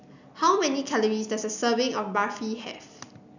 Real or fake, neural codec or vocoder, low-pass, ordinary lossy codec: real; none; 7.2 kHz; none